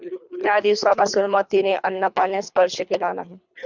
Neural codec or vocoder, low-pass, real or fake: codec, 24 kHz, 3 kbps, HILCodec; 7.2 kHz; fake